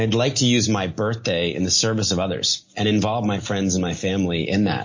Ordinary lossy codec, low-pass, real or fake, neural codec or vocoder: MP3, 32 kbps; 7.2 kHz; real; none